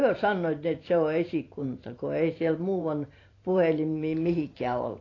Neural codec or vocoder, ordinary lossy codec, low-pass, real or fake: vocoder, 44.1 kHz, 128 mel bands every 512 samples, BigVGAN v2; AAC, 32 kbps; 7.2 kHz; fake